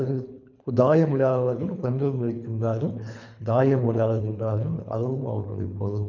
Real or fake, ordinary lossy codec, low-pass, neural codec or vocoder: fake; none; 7.2 kHz; codec, 24 kHz, 3 kbps, HILCodec